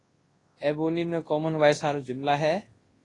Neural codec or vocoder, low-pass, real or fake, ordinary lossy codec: codec, 24 kHz, 0.9 kbps, WavTokenizer, large speech release; 10.8 kHz; fake; AAC, 32 kbps